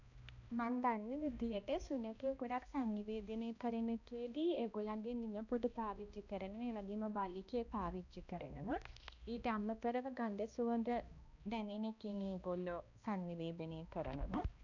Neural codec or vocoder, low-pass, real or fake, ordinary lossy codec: codec, 16 kHz, 1 kbps, X-Codec, HuBERT features, trained on balanced general audio; 7.2 kHz; fake; none